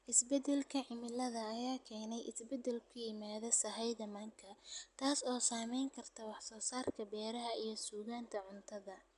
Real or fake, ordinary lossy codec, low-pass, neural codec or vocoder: real; none; 10.8 kHz; none